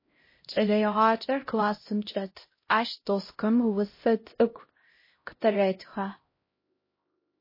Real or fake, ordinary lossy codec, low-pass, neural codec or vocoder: fake; MP3, 24 kbps; 5.4 kHz; codec, 16 kHz, 0.5 kbps, X-Codec, HuBERT features, trained on LibriSpeech